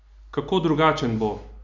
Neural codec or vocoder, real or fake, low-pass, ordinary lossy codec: none; real; 7.2 kHz; none